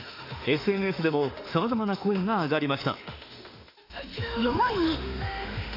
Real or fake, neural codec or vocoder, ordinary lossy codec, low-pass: fake; autoencoder, 48 kHz, 32 numbers a frame, DAC-VAE, trained on Japanese speech; AAC, 32 kbps; 5.4 kHz